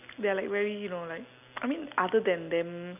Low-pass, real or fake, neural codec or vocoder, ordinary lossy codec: 3.6 kHz; real; none; none